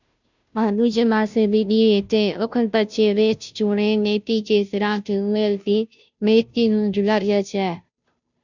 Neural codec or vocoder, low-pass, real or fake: codec, 16 kHz, 0.5 kbps, FunCodec, trained on Chinese and English, 25 frames a second; 7.2 kHz; fake